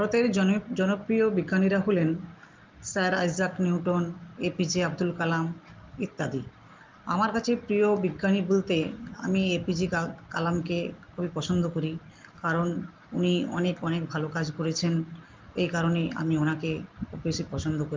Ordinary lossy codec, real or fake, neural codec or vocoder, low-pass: Opus, 32 kbps; real; none; 7.2 kHz